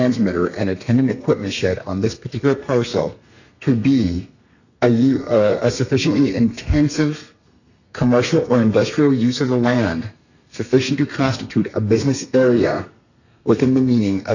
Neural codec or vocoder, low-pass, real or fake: codec, 32 kHz, 1.9 kbps, SNAC; 7.2 kHz; fake